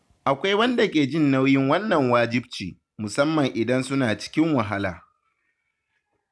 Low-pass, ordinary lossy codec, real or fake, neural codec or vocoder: none; none; real; none